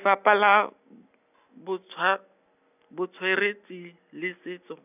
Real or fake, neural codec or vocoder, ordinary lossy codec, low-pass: real; none; none; 3.6 kHz